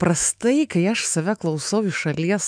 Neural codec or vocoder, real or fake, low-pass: autoencoder, 48 kHz, 128 numbers a frame, DAC-VAE, trained on Japanese speech; fake; 9.9 kHz